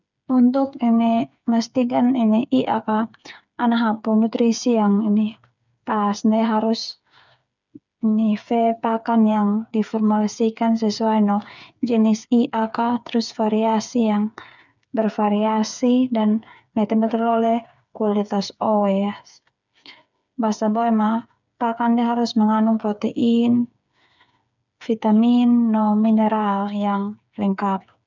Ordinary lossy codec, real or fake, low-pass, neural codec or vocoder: none; fake; 7.2 kHz; codec, 16 kHz, 8 kbps, FreqCodec, smaller model